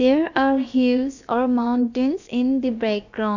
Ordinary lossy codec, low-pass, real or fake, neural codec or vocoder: none; 7.2 kHz; fake; codec, 16 kHz, about 1 kbps, DyCAST, with the encoder's durations